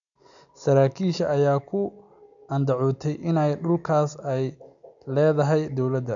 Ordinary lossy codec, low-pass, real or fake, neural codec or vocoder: none; 7.2 kHz; real; none